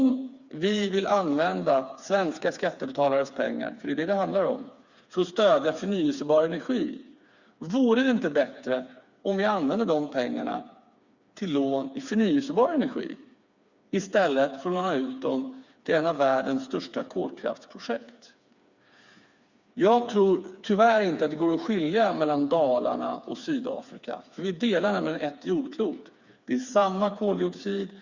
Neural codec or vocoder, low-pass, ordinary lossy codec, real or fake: codec, 16 kHz, 4 kbps, FreqCodec, smaller model; 7.2 kHz; Opus, 64 kbps; fake